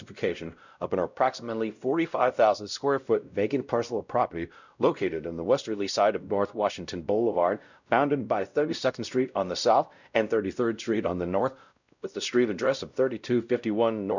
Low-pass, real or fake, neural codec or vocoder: 7.2 kHz; fake; codec, 16 kHz, 0.5 kbps, X-Codec, WavLM features, trained on Multilingual LibriSpeech